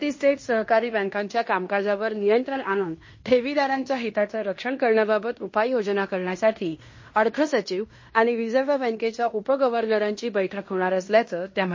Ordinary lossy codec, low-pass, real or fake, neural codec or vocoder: MP3, 32 kbps; 7.2 kHz; fake; codec, 16 kHz in and 24 kHz out, 0.9 kbps, LongCat-Audio-Codec, fine tuned four codebook decoder